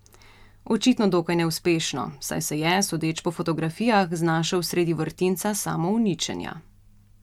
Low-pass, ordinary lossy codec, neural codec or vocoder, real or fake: 19.8 kHz; MP3, 96 kbps; none; real